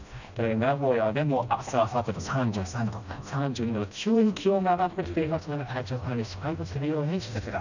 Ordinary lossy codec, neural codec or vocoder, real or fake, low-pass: none; codec, 16 kHz, 1 kbps, FreqCodec, smaller model; fake; 7.2 kHz